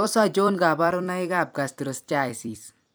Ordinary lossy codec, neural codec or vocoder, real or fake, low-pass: none; vocoder, 44.1 kHz, 128 mel bands every 256 samples, BigVGAN v2; fake; none